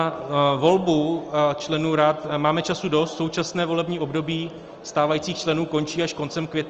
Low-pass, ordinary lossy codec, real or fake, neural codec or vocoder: 7.2 kHz; Opus, 24 kbps; real; none